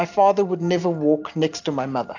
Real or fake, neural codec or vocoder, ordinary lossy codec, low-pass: real; none; AAC, 48 kbps; 7.2 kHz